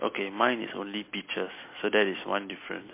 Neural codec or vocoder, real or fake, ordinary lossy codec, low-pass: none; real; MP3, 24 kbps; 3.6 kHz